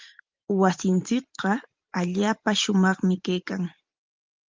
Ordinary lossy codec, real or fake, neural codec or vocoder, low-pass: Opus, 32 kbps; fake; vocoder, 44.1 kHz, 128 mel bands every 512 samples, BigVGAN v2; 7.2 kHz